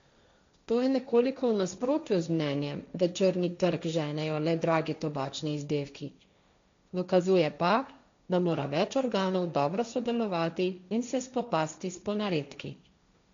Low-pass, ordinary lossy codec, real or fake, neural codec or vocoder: 7.2 kHz; MP3, 64 kbps; fake; codec, 16 kHz, 1.1 kbps, Voila-Tokenizer